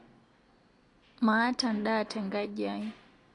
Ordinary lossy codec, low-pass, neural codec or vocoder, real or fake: AAC, 48 kbps; 10.8 kHz; none; real